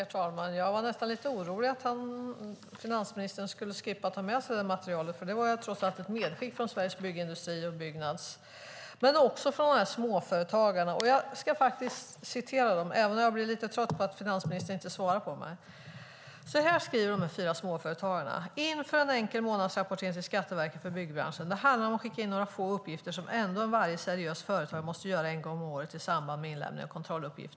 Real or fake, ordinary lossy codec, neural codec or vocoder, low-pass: real; none; none; none